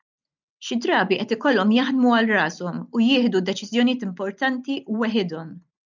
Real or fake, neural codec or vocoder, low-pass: real; none; 7.2 kHz